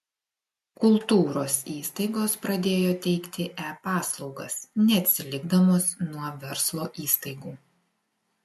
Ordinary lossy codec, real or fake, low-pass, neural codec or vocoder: MP3, 64 kbps; real; 14.4 kHz; none